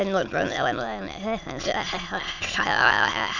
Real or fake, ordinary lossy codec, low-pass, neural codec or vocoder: fake; none; 7.2 kHz; autoencoder, 22.05 kHz, a latent of 192 numbers a frame, VITS, trained on many speakers